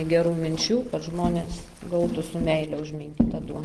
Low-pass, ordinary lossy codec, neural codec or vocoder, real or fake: 10.8 kHz; Opus, 16 kbps; none; real